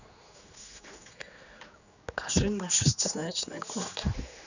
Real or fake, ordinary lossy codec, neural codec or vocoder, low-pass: fake; none; codec, 16 kHz, 2 kbps, X-Codec, HuBERT features, trained on general audio; 7.2 kHz